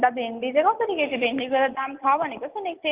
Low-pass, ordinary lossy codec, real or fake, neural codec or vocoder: 3.6 kHz; Opus, 32 kbps; real; none